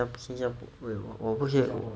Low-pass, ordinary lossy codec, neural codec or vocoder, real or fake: none; none; none; real